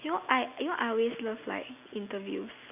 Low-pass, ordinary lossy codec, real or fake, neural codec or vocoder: 3.6 kHz; none; real; none